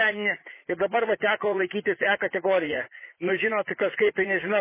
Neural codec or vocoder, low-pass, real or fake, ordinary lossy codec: vocoder, 44.1 kHz, 80 mel bands, Vocos; 3.6 kHz; fake; MP3, 16 kbps